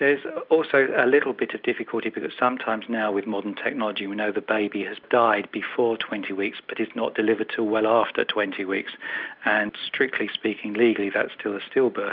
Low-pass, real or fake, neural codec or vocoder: 5.4 kHz; real; none